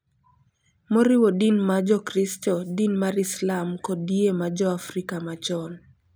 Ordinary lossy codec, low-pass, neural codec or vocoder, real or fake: none; none; none; real